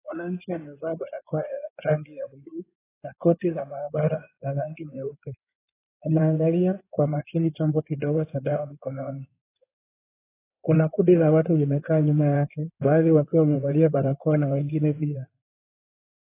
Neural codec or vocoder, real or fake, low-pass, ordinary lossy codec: codec, 16 kHz in and 24 kHz out, 2.2 kbps, FireRedTTS-2 codec; fake; 3.6 kHz; AAC, 16 kbps